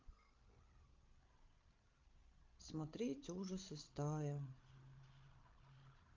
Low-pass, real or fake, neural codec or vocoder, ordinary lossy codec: 7.2 kHz; fake; codec, 16 kHz, 16 kbps, FreqCodec, larger model; Opus, 24 kbps